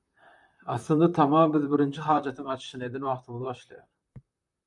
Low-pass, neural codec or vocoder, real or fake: 10.8 kHz; vocoder, 44.1 kHz, 128 mel bands, Pupu-Vocoder; fake